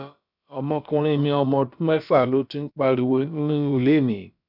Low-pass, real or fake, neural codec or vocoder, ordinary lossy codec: 5.4 kHz; fake; codec, 16 kHz, about 1 kbps, DyCAST, with the encoder's durations; none